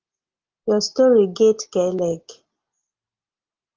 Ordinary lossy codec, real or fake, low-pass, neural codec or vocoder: Opus, 24 kbps; real; 7.2 kHz; none